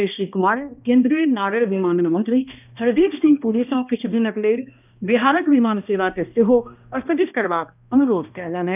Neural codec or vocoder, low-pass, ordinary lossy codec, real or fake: codec, 16 kHz, 1 kbps, X-Codec, HuBERT features, trained on balanced general audio; 3.6 kHz; none; fake